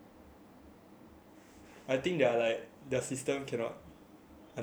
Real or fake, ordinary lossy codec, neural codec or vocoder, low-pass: fake; none; vocoder, 44.1 kHz, 128 mel bands every 512 samples, BigVGAN v2; none